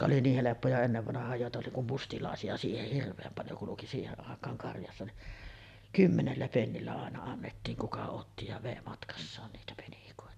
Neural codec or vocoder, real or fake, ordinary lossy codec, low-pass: none; real; none; 14.4 kHz